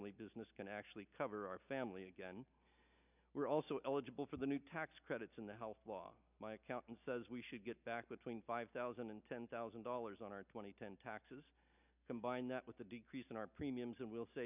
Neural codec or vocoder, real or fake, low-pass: none; real; 3.6 kHz